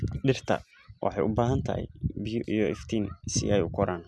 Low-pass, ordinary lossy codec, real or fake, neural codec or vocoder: none; none; real; none